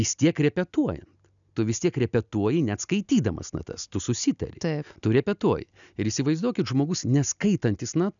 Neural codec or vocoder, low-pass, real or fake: none; 7.2 kHz; real